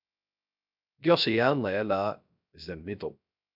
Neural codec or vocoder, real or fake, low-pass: codec, 16 kHz, 0.3 kbps, FocalCodec; fake; 5.4 kHz